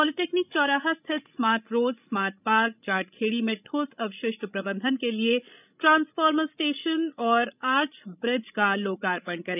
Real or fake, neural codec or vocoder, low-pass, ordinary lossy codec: fake; codec, 16 kHz, 16 kbps, FreqCodec, larger model; 3.6 kHz; none